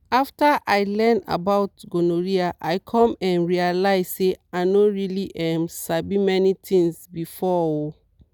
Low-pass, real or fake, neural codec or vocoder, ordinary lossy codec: none; real; none; none